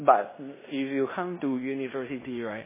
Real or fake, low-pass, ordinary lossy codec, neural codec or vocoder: fake; 3.6 kHz; MP3, 16 kbps; codec, 16 kHz in and 24 kHz out, 0.9 kbps, LongCat-Audio-Codec, fine tuned four codebook decoder